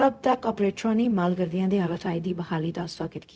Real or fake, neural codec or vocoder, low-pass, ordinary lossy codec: fake; codec, 16 kHz, 0.4 kbps, LongCat-Audio-Codec; none; none